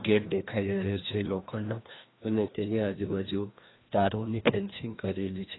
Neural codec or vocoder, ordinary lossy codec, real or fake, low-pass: codec, 16 kHz, 1 kbps, FreqCodec, larger model; AAC, 16 kbps; fake; 7.2 kHz